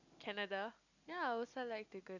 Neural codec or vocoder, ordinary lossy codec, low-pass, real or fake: none; none; 7.2 kHz; real